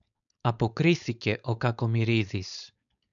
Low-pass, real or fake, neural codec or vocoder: 7.2 kHz; fake; codec, 16 kHz, 4.8 kbps, FACodec